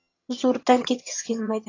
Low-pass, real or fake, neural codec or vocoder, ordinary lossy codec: 7.2 kHz; fake; vocoder, 22.05 kHz, 80 mel bands, HiFi-GAN; AAC, 32 kbps